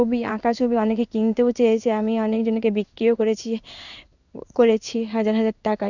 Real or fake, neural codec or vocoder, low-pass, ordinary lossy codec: fake; codec, 16 kHz in and 24 kHz out, 1 kbps, XY-Tokenizer; 7.2 kHz; none